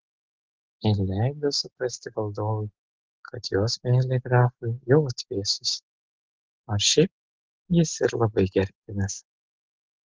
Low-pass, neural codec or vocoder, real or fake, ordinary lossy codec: 7.2 kHz; none; real; Opus, 16 kbps